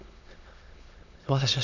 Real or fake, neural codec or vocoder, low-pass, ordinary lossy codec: fake; autoencoder, 22.05 kHz, a latent of 192 numbers a frame, VITS, trained on many speakers; 7.2 kHz; none